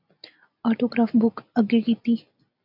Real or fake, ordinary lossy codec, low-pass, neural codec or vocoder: real; AAC, 48 kbps; 5.4 kHz; none